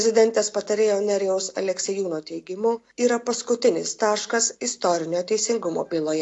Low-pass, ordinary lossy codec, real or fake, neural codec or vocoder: 10.8 kHz; AAC, 48 kbps; real; none